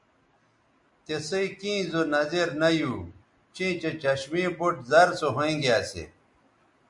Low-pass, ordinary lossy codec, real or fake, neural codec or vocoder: 10.8 kHz; MP3, 64 kbps; real; none